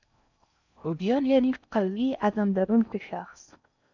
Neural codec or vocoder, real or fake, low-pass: codec, 16 kHz in and 24 kHz out, 0.6 kbps, FocalCodec, streaming, 4096 codes; fake; 7.2 kHz